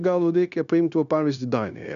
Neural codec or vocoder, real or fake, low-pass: codec, 16 kHz, 0.9 kbps, LongCat-Audio-Codec; fake; 7.2 kHz